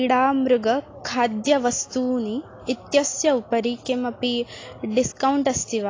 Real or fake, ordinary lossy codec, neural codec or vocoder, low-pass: real; AAC, 32 kbps; none; 7.2 kHz